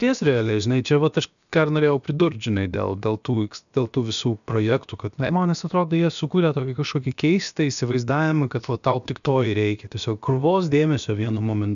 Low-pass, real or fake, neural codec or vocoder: 7.2 kHz; fake; codec, 16 kHz, about 1 kbps, DyCAST, with the encoder's durations